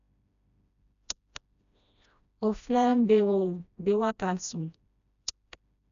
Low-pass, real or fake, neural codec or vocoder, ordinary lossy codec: 7.2 kHz; fake; codec, 16 kHz, 1 kbps, FreqCodec, smaller model; none